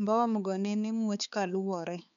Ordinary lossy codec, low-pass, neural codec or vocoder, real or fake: none; 7.2 kHz; codec, 16 kHz, 4 kbps, X-Codec, HuBERT features, trained on balanced general audio; fake